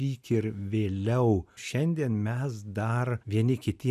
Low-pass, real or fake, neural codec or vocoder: 14.4 kHz; real; none